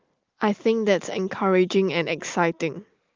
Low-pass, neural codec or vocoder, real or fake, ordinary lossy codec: 7.2 kHz; none; real; Opus, 32 kbps